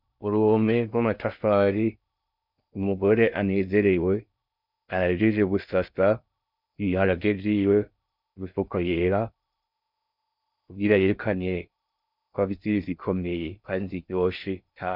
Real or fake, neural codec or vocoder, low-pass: fake; codec, 16 kHz in and 24 kHz out, 0.6 kbps, FocalCodec, streaming, 2048 codes; 5.4 kHz